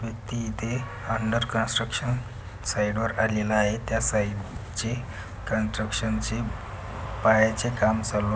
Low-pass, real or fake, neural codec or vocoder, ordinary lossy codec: none; real; none; none